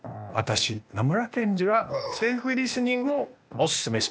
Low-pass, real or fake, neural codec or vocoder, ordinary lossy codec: none; fake; codec, 16 kHz, 0.8 kbps, ZipCodec; none